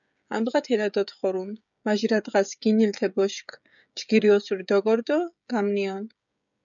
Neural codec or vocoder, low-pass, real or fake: codec, 16 kHz, 16 kbps, FreqCodec, smaller model; 7.2 kHz; fake